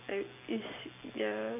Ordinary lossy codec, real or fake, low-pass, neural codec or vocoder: none; real; 3.6 kHz; none